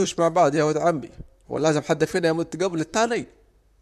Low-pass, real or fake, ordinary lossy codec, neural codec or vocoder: 14.4 kHz; fake; none; vocoder, 44.1 kHz, 128 mel bands, Pupu-Vocoder